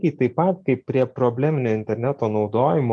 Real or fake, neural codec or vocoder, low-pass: real; none; 9.9 kHz